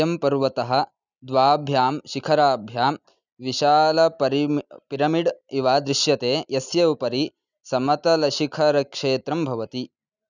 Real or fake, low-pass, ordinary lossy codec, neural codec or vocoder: real; 7.2 kHz; none; none